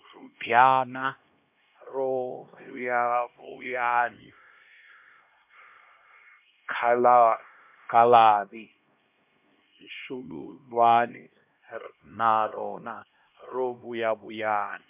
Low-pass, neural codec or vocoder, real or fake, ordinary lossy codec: 3.6 kHz; codec, 16 kHz, 1 kbps, X-Codec, HuBERT features, trained on LibriSpeech; fake; MP3, 32 kbps